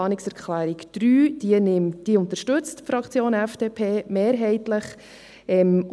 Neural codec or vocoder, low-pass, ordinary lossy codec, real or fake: none; none; none; real